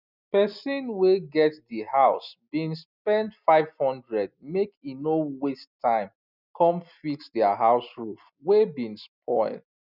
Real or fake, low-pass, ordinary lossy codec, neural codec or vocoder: real; 5.4 kHz; none; none